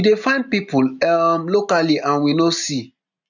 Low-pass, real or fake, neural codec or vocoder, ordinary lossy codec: 7.2 kHz; real; none; none